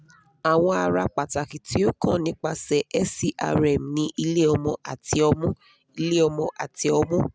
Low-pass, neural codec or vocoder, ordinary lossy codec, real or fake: none; none; none; real